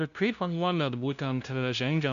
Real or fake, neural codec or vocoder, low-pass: fake; codec, 16 kHz, 0.5 kbps, FunCodec, trained on LibriTTS, 25 frames a second; 7.2 kHz